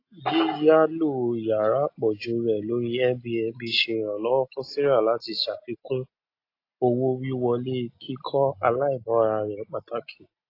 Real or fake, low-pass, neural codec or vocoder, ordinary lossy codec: real; 5.4 kHz; none; AAC, 32 kbps